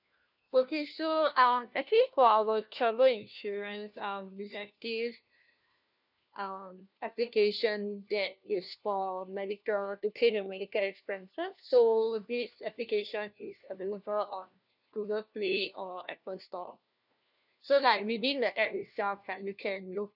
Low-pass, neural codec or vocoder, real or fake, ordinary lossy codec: 5.4 kHz; codec, 16 kHz, 1 kbps, FunCodec, trained on LibriTTS, 50 frames a second; fake; none